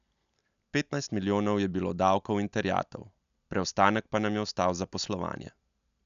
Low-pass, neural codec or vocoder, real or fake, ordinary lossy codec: 7.2 kHz; none; real; none